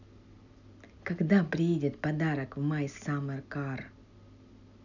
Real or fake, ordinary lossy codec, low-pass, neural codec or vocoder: real; none; 7.2 kHz; none